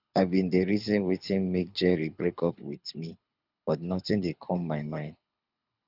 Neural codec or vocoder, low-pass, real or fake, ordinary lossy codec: codec, 24 kHz, 6 kbps, HILCodec; 5.4 kHz; fake; none